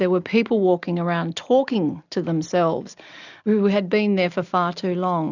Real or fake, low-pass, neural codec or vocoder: real; 7.2 kHz; none